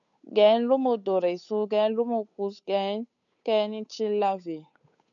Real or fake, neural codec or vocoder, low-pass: fake; codec, 16 kHz, 8 kbps, FunCodec, trained on Chinese and English, 25 frames a second; 7.2 kHz